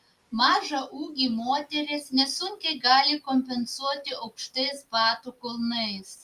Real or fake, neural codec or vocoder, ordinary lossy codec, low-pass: real; none; Opus, 32 kbps; 14.4 kHz